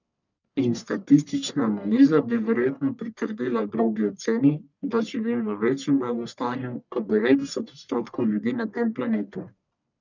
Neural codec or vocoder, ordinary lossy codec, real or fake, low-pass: codec, 44.1 kHz, 1.7 kbps, Pupu-Codec; none; fake; 7.2 kHz